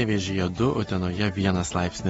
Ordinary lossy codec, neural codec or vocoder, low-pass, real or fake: AAC, 24 kbps; none; 19.8 kHz; real